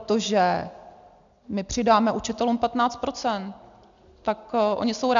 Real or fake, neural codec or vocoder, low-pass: real; none; 7.2 kHz